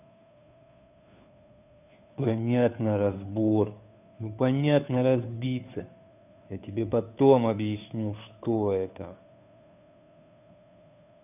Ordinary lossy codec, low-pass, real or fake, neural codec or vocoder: none; 3.6 kHz; fake; codec, 16 kHz, 2 kbps, FunCodec, trained on Chinese and English, 25 frames a second